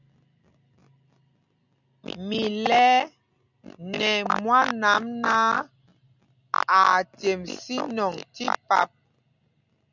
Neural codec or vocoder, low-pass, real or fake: none; 7.2 kHz; real